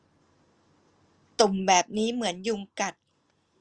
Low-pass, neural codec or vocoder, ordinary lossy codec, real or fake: 9.9 kHz; none; Opus, 32 kbps; real